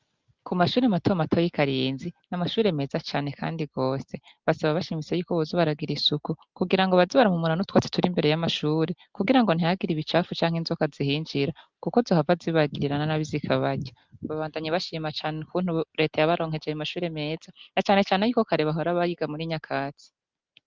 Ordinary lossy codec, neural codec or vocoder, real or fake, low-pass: Opus, 32 kbps; none; real; 7.2 kHz